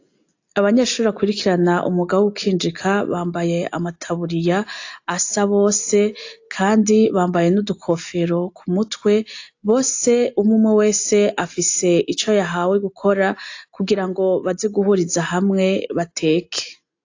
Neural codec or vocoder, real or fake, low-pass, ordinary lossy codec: none; real; 7.2 kHz; AAC, 48 kbps